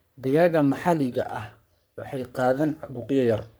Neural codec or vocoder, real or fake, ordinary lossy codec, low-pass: codec, 44.1 kHz, 3.4 kbps, Pupu-Codec; fake; none; none